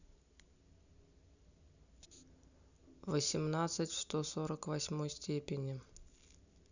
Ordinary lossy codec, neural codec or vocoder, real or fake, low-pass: none; none; real; 7.2 kHz